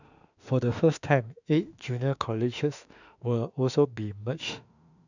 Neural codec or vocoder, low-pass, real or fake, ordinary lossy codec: autoencoder, 48 kHz, 32 numbers a frame, DAC-VAE, trained on Japanese speech; 7.2 kHz; fake; none